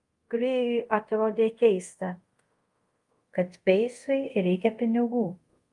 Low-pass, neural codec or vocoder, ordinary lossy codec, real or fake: 10.8 kHz; codec, 24 kHz, 0.5 kbps, DualCodec; Opus, 32 kbps; fake